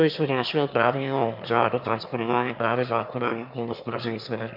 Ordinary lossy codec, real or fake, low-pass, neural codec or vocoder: MP3, 48 kbps; fake; 5.4 kHz; autoencoder, 22.05 kHz, a latent of 192 numbers a frame, VITS, trained on one speaker